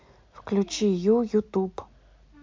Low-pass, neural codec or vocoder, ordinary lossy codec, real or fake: 7.2 kHz; none; MP3, 48 kbps; real